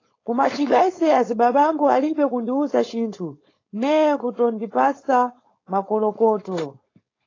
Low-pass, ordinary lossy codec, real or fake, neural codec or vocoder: 7.2 kHz; AAC, 32 kbps; fake; codec, 16 kHz, 4.8 kbps, FACodec